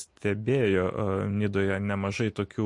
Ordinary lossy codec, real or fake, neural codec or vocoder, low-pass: MP3, 48 kbps; real; none; 10.8 kHz